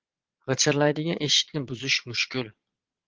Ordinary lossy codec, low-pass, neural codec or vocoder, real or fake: Opus, 16 kbps; 7.2 kHz; vocoder, 22.05 kHz, 80 mel bands, Vocos; fake